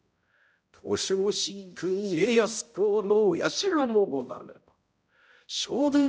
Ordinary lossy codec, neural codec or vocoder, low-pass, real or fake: none; codec, 16 kHz, 0.5 kbps, X-Codec, HuBERT features, trained on balanced general audio; none; fake